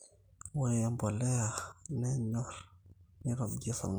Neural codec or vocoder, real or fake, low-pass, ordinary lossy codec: none; real; none; none